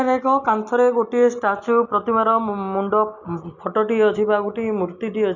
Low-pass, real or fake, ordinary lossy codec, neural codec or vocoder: 7.2 kHz; real; none; none